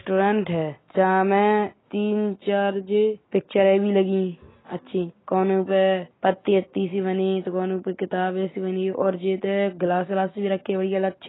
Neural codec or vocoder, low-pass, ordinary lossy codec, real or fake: none; 7.2 kHz; AAC, 16 kbps; real